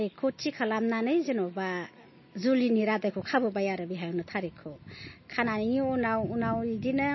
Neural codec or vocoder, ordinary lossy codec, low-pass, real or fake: none; MP3, 24 kbps; 7.2 kHz; real